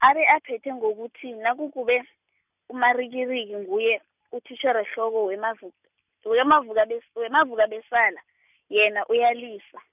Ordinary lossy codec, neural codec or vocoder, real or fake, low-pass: none; none; real; 3.6 kHz